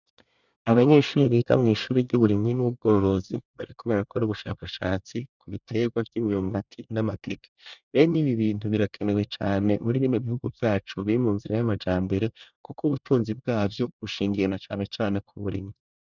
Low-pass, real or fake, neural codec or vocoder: 7.2 kHz; fake; codec, 24 kHz, 1 kbps, SNAC